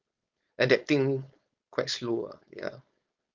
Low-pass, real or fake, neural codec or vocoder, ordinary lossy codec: 7.2 kHz; fake; codec, 16 kHz, 4.8 kbps, FACodec; Opus, 24 kbps